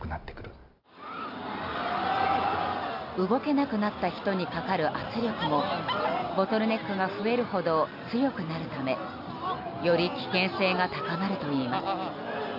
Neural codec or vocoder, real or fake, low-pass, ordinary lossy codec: none; real; 5.4 kHz; AAC, 48 kbps